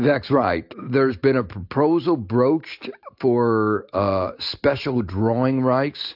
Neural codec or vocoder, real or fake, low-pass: none; real; 5.4 kHz